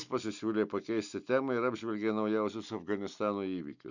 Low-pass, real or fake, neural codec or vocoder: 7.2 kHz; real; none